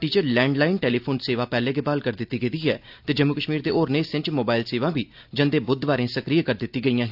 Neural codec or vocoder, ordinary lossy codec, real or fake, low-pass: none; AAC, 48 kbps; real; 5.4 kHz